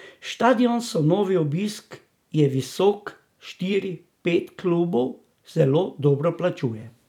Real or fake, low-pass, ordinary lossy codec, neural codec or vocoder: fake; 19.8 kHz; none; vocoder, 44.1 kHz, 128 mel bands every 256 samples, BigVGAN v2